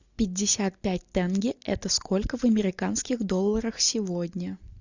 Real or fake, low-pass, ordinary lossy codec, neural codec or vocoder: real; 7.2 kHz; Opus, 64 kbps; none